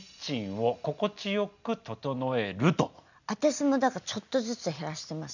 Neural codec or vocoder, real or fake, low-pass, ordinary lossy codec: none; real; 7.2 kHz; none